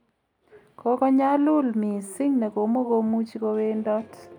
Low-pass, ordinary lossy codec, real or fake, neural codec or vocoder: 19.8 kHz; none; real; none